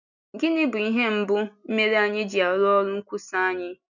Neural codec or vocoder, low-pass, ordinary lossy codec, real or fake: none; 7.2 kHz; none; real